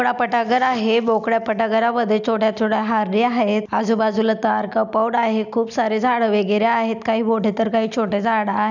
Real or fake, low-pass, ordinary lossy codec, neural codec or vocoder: real; 7.2 kHz; none; none